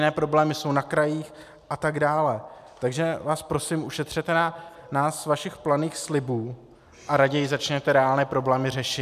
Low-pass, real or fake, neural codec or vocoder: 14.4 kHz; real; none